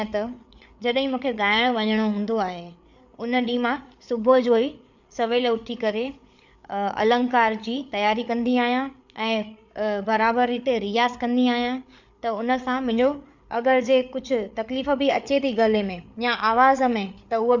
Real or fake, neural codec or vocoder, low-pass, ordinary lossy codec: fake; codec, 16 kHz, 8 kbps, FreqCodec, larger model; 7.2 kHz; none